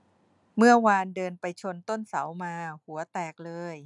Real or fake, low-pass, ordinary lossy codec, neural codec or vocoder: real; 10.8 kHz; none; none